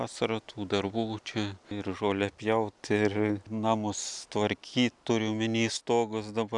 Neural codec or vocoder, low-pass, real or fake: none; 10.8 kHz; real